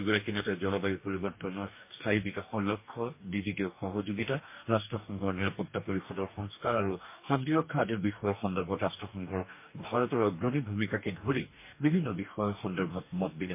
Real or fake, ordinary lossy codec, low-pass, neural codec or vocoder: fake; MP3, 24 kbps; 3.6 kHz; codec, 44.1 kHz, 2.6 kbps, DAC